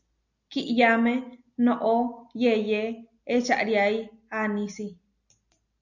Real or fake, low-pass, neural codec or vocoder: real; 7.2 kHz; none